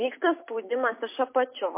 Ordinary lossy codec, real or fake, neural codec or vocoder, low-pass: MP3, 24 kbps; real; none; 3.6 kHz